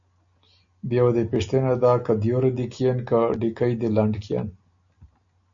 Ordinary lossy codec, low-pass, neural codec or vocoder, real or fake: MP3, 64 kbps; 7.2 kHz; none; real